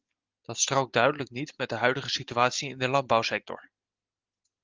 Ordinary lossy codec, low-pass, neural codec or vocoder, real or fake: Opus, 24 kbps; 7.2 kHz; none; real